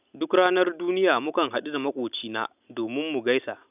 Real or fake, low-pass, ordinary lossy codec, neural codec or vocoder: real; 3.6 kHz; none; none